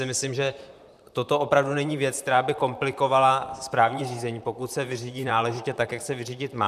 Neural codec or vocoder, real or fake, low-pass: vocoder, 44.1 kHz, 128 mel bands, Pupu-Vocoder; fake; 14.4 kHz